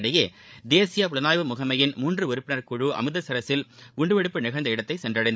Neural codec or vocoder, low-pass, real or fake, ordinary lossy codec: codec, 16 kHz, 16 kbps, FreqCodec, larger model; none; fake; none